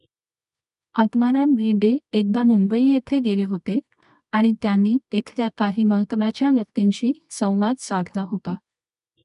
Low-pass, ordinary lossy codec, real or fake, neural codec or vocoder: 10.8 kHz; none; fake; codec, 24 kHz, 0.9 kbps, WavTokenizer, medium music audio release